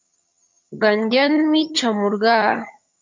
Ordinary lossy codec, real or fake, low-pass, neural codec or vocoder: MP3, 48 kbps; fake; 7.2 kHz; vocoder, 22.05 kHz, 80 mel bands, HiFi-GAN